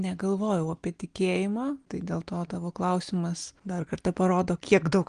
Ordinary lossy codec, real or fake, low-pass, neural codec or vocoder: Opus, 24 kbps; real; 9.9 kHz; none